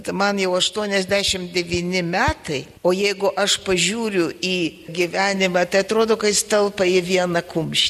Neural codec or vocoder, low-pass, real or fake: none; 14.4 kHz; real